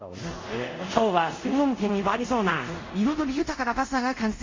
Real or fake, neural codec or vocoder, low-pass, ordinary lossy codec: fake; codec, 24 kHz, 0.5 kbps, DualCodec; 7.2 kHz; MP3, 32 kbps